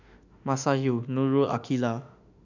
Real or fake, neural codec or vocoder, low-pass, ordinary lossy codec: fake; autoencoder, 48 kHz, 32 numbers a frame, DAC-VAE, trained on Japanese speech; 7.2 kHz; none